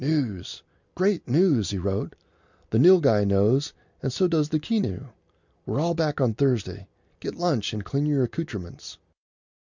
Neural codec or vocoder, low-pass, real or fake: none; 7.2 kHz; real